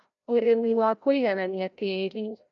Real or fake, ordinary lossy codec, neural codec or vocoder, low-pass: fake; none; codec, 16 kHz, 0.5 kbps, FreqCodec, larger model; 7.2 kHz